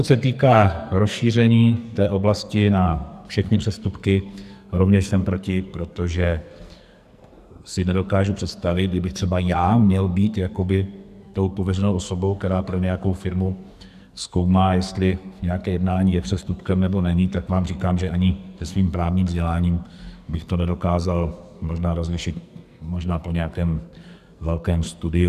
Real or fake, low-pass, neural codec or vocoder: fake; 14.4 kHz; codec, 44.1 kHz, 2.6 kbps, SNAC